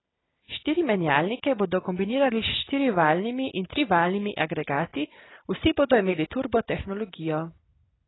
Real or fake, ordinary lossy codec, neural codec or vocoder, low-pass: real; AAC, 16 kbps; none; 7.2 kHz